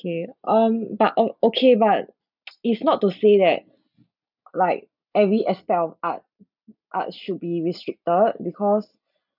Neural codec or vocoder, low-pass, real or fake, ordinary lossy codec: none; 5.4 kHz; real; none